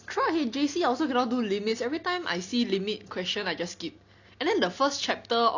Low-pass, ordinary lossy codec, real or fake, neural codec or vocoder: 7.2 kHz; none; real; none